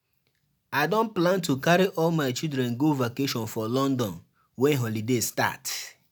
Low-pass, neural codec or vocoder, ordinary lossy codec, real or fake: none; none; none; real